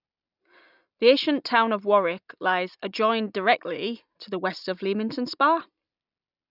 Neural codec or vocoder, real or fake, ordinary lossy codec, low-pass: none; real; none; 5.4 kHz